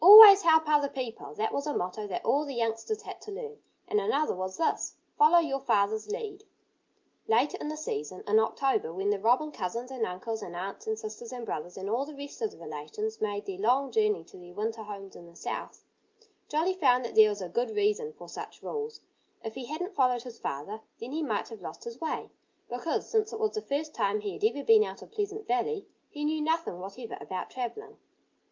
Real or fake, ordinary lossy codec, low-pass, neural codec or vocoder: real; Opus, 24 kbps; 7.2 kHz; none